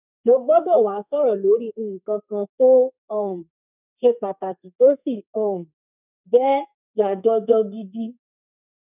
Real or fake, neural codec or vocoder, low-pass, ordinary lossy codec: fake; codec, 32 kHz, 1.9 kbps, SNAC; 3.6 kHz; none